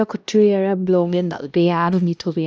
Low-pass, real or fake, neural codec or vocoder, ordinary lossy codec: none; fake; codec, 16 kHz, 1 kbps, X-Codec, HuBERT features, trained on LibriSpeech; none